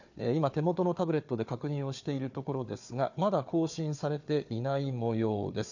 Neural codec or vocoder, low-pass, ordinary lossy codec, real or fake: codec, 16 kHz, 4 kbps, FunCodec, trained on Chinese and English, 50 frames a second; 7.2 kHz; none; fake